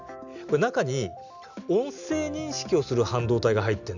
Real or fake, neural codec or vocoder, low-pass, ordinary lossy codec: real; none; 7.2 kHz; none